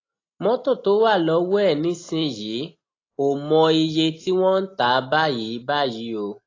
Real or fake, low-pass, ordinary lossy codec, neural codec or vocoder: real; 7.2 kHz; AAC, 32 kbps; none